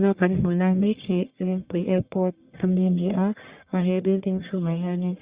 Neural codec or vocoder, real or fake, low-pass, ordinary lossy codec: codec, 44.1 kHz, 1.7 kbps, Pupu-Codec; fake; 3.6 kHz; Opus, 64 kbps